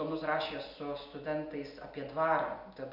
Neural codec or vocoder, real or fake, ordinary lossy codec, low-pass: none; real; Opus, 64 kbps; 5.4 kHz